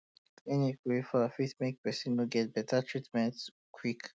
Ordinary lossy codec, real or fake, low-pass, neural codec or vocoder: none; real; none; none